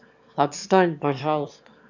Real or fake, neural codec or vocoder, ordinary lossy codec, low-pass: fake; autoencoder, 22.05 kHz, a latent of 192 numbers a frame, VITS, trained on one speaker; none; 7.2 kHz